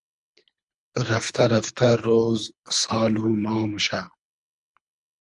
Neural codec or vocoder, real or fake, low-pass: codec, 24 kHz, 3 kbps, HILCodec; fake; 10.8 kHz